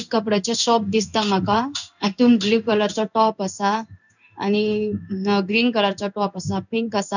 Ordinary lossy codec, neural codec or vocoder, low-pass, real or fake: none; codec, 16 kHz in and 24 kHz out, 1 kbps, XY-Tokenizer; 7.2 kHz; fake